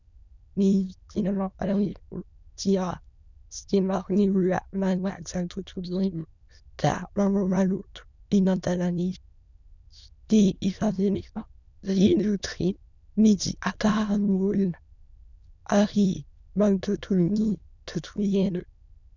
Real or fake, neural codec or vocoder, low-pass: fake; autoencoder, 22.05 kHz, a latent of 192 numbers a frame, VITS, trained on many speakers; 7.2 kHz